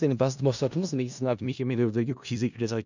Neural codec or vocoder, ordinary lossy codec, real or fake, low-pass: codec, 16 kHz in and 24 kHz out, 0.4 kbps, LongCat-Audio-Codec, four codebook decoder; none; fake; 7.2 kHz